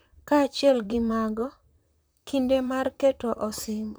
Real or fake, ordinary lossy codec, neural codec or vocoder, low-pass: fake; none; vocoder, 44.1 kHz, 128 mel bands, Pupu-Vocoder; none